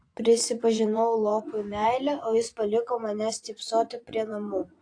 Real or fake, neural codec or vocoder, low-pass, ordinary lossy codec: fake; vocoder, 24 kHz, 100 mel bands, Vocos; 9.9 kHz; AAC, 48 kbps